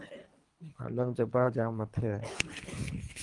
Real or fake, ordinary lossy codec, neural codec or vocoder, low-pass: fake; Opus, 32 kbps; codec, 24 kHz, 3 kbps, HILCodec; 10.8 kHz